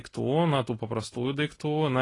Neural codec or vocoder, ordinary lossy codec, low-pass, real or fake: none; AAC, 32 kbps; 10.8 kHz; real